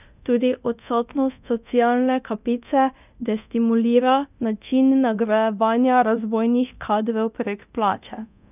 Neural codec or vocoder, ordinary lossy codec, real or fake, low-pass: codec, 24 kHz, 0.9 kbps, DualCodec; none; fake; 3.6 kHz